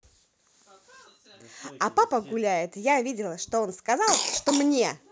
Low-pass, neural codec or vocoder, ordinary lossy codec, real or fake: none; none; none; real